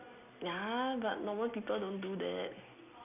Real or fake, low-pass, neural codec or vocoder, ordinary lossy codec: real; 3.6 kHz; none; none